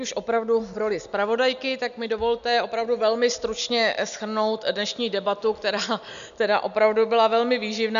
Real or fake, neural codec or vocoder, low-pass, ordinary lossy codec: real; none; 7.2 kHz; MP3, 96 kbps